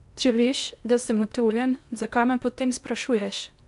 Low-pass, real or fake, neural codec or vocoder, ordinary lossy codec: 10.8 kHz; fake; codec, 16 kHz in and 24 kHz out, 0.6 kbps, FocalCodec, streaming, 2048 codes; none